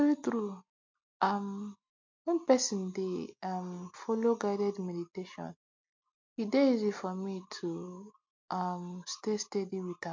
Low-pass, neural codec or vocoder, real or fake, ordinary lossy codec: 7.2 kHz; none; real; MP3, 48 kbps